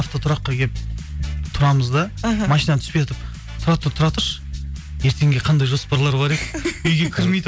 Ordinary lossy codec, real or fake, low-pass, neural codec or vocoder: none; real; none; none